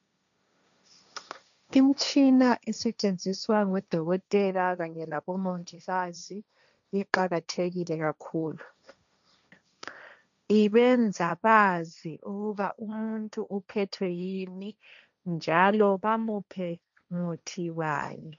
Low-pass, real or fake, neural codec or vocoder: 7.2 kHz; fake; codec, 16 kHz, 1.1 kbps, Voila-Tokenizer